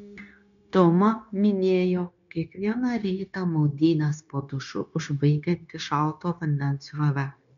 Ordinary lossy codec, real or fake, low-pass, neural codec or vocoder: MP3, 96 kbps; fake; 7.2 kHz; codec, 16 kHz, 0.9 kbps, LongCat-Audio-Codec